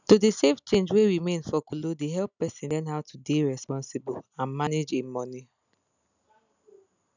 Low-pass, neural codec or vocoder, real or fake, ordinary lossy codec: 7.2 kHz; none; real; none